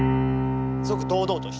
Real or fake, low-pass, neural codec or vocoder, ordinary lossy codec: real; none; none; none